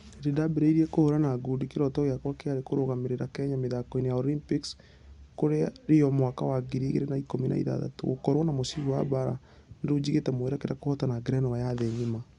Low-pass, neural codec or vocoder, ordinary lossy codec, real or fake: 10.8 kHz; none; Opus, 64 kbps; real